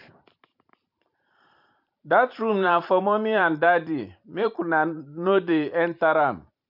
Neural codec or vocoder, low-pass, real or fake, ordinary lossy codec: vocoder, 44.1 kHz, 128 mel bands every 512 samples, BigVGAN v2; 5.4 kHz; fake; MP3, 48 kbps